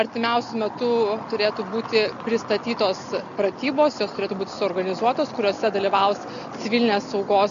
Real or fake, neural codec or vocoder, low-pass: real; none; 7.2 kHz